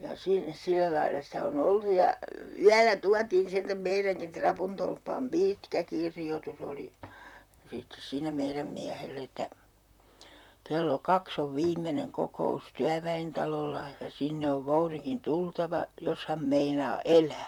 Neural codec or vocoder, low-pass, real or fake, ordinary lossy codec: vocoder, 44.1 kHz, 128 mel bands, Pupu-Vocoder; 19.8 kHz; fake; none